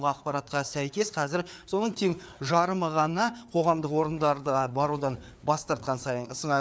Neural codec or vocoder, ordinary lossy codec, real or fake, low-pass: codec, 16 kHz, 4 kbps, FreqCodec, larger model; none; fake; none